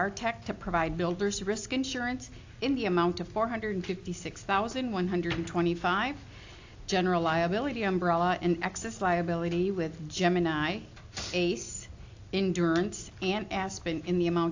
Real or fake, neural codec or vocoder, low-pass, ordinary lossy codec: real; none; 7.2 kHz; AAC, 48 kbps